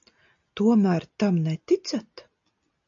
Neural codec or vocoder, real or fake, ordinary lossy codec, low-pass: none; real; AAC, 64 kbps; 7.2 kHz